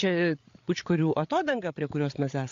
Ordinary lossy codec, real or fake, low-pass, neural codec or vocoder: AAC, 48 kbps; fake; 7.2 kHz; codec, 16 kHz, 8 kbps, FreqCodec, larger model